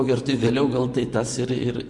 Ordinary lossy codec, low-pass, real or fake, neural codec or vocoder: MP3, 64 kbps; 10.8 kHz; fake; vocoder, 24 kHz, 100 mel bands, Vocos